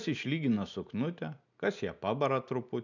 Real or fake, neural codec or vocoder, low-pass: real; none; 7.2 kHz